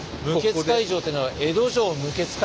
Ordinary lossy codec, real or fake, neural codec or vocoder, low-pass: none; real; none; none